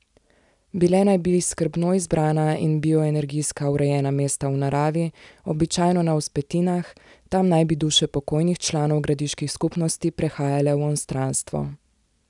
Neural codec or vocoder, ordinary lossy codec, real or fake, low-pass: none; none; real; 10.8 kHz